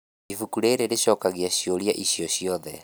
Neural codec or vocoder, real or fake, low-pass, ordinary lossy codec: none; real; none; none